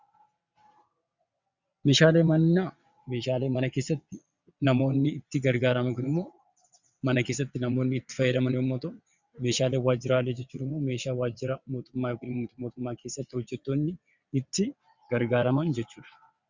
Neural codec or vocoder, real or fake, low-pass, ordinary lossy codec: vocoder, 22.05 kHz, 80 mel bands, WaveNeXt; fake; 7.2 kHz; Opus, 64 kbps